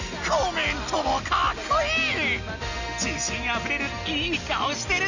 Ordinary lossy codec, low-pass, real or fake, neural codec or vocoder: none; 7.2 kHz; real; none